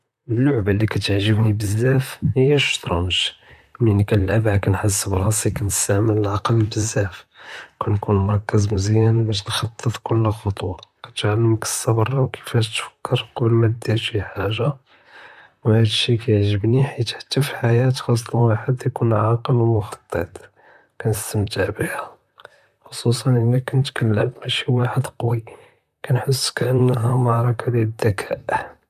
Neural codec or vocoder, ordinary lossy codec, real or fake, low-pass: vocoder, 44.1 kHz, 128 mel bands, Pupu-Vocoder; none; fake; 14.4 kHz